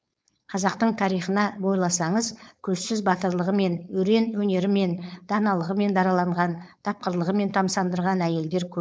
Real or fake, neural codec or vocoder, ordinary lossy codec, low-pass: fake; codec, 16 kHz, 4.8 kbps, FACodec; none; none